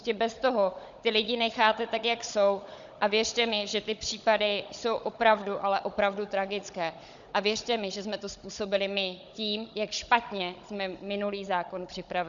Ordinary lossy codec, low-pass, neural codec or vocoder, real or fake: Opus, 64 kbps; 7.2 kHz; codec, 16 kHz, 16 kbps, FunCodec, trained on Chinese and English, 50 frames a second; fake